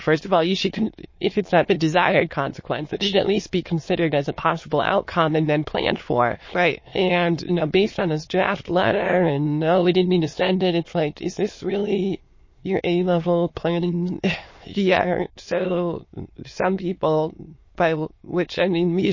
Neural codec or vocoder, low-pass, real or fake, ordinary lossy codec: autoencoder, 22.05 kHz, a latent of 192 numbers a frame, VITS, trained on many speakers; 7.2 kHz; fake; MP3, 32 kbps